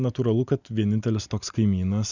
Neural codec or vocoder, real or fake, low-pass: none; real; 7.2 kHz